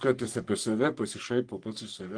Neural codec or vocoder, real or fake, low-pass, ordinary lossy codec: codec, 44.1 kHz, 3.4 kbps, Pupu-Codec; fake; 9.9 kHz; Opus, 24 kbps